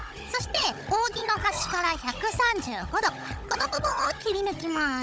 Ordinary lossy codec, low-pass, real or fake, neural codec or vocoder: none; none; fake; codec, 16 kHz, 16 kbps, FunCodec, trained on Chinese and English, 50 frames a second